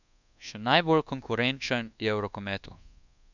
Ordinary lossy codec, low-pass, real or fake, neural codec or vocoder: none; 7.2 kHz; fake; codec, 24 kHz, 1.2 kbps, DualCodec